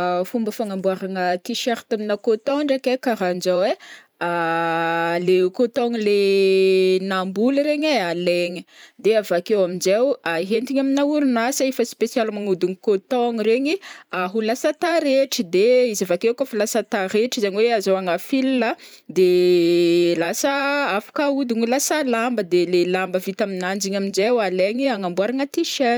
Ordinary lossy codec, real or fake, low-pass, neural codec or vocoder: none; fake; none; vocoder, 44.1 kHz, 128 mel bands every 512 samples, BigVGAN v2